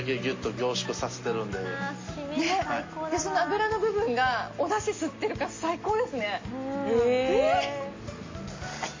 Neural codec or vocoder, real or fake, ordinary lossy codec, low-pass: none; real; MP3, 32 kbps; 7.2 kHz